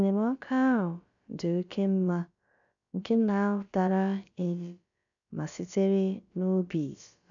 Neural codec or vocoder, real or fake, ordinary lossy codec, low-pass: codec, 16 kHz, about 1 kbps, DyCAST, with the encoder's durations; fake; none; 7.2 kHz